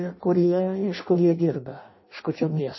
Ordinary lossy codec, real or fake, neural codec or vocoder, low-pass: MP3, 24 kbps; fake; codec, 16 kHz in and 24 kHz out, 0.6 kbps, FireRedTTS-2 codec; 7.2 kHz